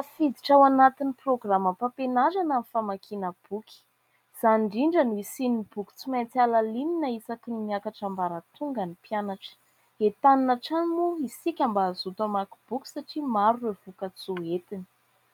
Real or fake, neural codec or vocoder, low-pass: real; none; 19.8 kHz